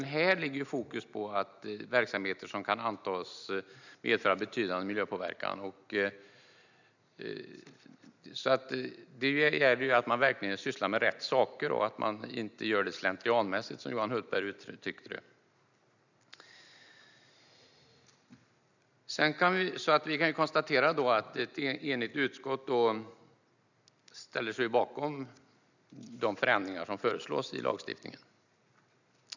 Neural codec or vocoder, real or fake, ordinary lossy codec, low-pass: none; real; none; 7.2 kHz